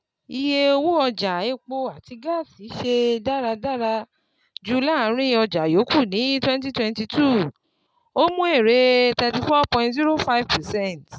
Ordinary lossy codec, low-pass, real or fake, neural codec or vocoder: none; none; real; none